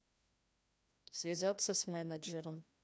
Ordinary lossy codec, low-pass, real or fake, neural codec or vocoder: none; none; fake; codec, 16 kHz, 1 kbps, FreqCodec, larger model